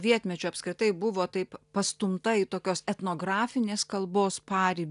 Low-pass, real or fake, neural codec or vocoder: 10.8 kHz; real; none